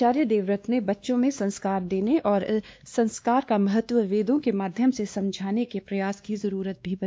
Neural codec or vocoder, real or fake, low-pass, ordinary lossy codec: codec, 16 kHz, 2 kbps, X-Codec, WavLM features, trained on Multilingual LibriSpeech; fake; none; none